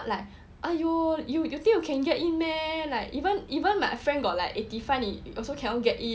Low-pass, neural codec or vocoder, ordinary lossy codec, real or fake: none; none; none; real